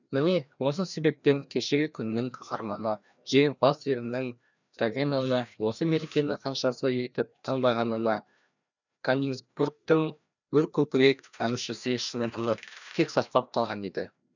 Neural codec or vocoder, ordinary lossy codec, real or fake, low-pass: codec, 16 kHz, 1 kbps, FreqCodec, larger model; none; fake; 7.2 kHz